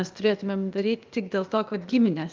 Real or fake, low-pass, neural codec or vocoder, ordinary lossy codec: fake; 7.2 kHz; codec, 16 kHz, 0.8 kbps, ZipCodec; Opus, 24 kbps